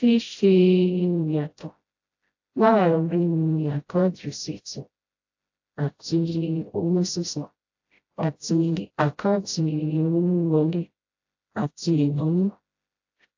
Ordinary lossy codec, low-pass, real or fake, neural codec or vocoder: AAC, 48 kbps; 7.2 kHz; fake; codec, 16 kHz, 0.5 kbps, FreqCodec, smaller model